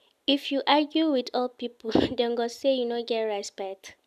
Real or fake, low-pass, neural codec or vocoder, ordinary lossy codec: real; 14.4 kHz; none; none